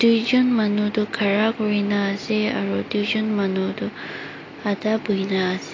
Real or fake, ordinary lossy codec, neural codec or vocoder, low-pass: real; AAC, 32 kbps; none; 7.2 kHz